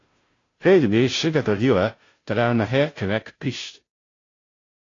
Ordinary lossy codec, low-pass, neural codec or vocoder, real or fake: AAC, 32 kbps; 7.2 kHz; codec, 16 kHz, 0.5 kbps, FunCodec, trained on Chinese and English, 25 frames a second; fake